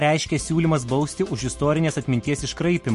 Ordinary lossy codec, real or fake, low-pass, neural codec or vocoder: MP3, 48 kbps; real; 14.4 kHz; none